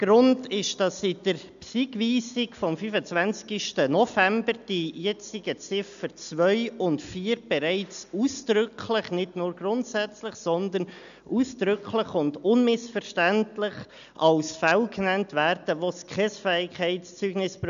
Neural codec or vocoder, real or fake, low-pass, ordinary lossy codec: none; real; 7.2 kHz; AAC, 96 kbps